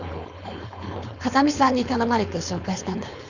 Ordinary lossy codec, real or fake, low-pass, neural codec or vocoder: none; fake; 7.2 kHz; codec, 16 kHz, 4.8 kbps, FACodec